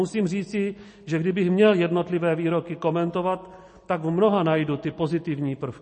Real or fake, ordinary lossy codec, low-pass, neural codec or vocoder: real; MP3, 32 kbps; 10.8 kHz; none